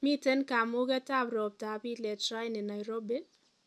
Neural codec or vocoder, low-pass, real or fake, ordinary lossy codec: none; none; real; none